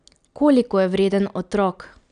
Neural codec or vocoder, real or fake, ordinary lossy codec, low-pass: none; real; none; 9.9 kHz